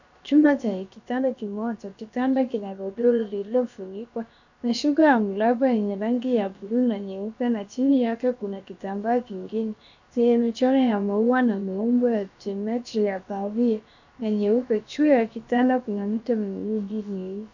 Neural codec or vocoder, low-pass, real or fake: codec, 16 kHz, about 1 kbps, DyCAST, with the encoder's durations; 7.2 kHz; fake